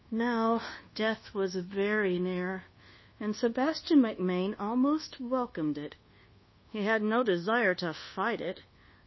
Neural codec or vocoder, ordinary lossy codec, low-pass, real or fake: codec, 24 kHz, 1.2 kbps, DualCodec; MP3, 24 kbps; 7.2 kHz; fake